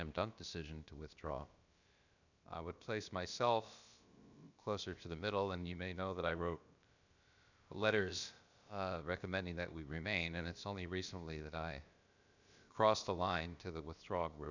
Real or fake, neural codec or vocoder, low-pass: fake; codec, 16 kHz, about 1 kbps, DyCAST, with the encoder's durations; 7.2 kHz